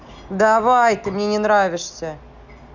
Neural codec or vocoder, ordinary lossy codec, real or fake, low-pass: none; none; real; 7.2 kHz